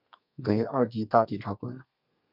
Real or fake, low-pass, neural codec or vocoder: fake; 5.4 kHz; codec, 32 kHz, 1.9 kbps, SNAC